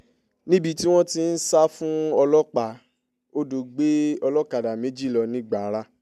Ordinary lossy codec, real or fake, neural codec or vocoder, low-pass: MP3, 96 kbps; real; none; 14.4 kHz